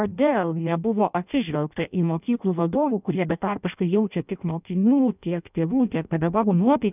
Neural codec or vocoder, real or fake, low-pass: codec, 16 kHz in and 24 kHz out, 0.6 kbps, FireRedTTS-2 codec; fake; 3.6 kHz